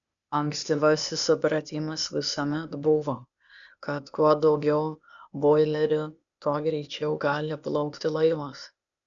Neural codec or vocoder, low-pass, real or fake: codec, 16 kHz, 0.8 kbps, ZipCodec; 7.2 kHz; fake